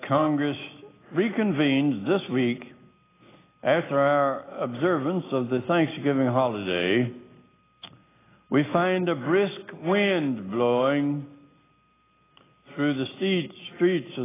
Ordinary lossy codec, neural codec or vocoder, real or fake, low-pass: AAC, 16 kbps; none; real; 3.6 kHz